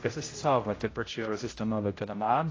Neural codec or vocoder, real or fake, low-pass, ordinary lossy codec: codec, 16 kHz, 0.5 kbps, X-Codec, HuBERT features, trained on general audio; fake; 7.2 kHz; AAC, 32 kbps